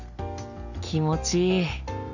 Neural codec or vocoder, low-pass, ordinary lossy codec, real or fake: none; 7.2 kHz; none; real